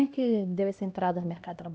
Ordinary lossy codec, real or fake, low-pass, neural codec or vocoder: none; fake; none; codec, 16 kHz, 1 kbps, X-Codec, HuBERT features, trained on LibriSpeech